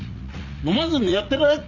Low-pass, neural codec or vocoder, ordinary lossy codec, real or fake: 7.2 kHz; vocoder, 44.1 kHz, 80 mel bands, Vocos; none; fake